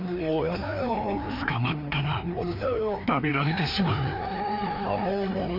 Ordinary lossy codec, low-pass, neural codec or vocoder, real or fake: MP3, 48 kbps; 5.4 kHz; codec, 16 kHz, 2 kbps, FreqCodec, larger model; fake